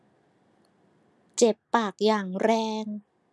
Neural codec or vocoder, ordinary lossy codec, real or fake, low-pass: none; none; real; none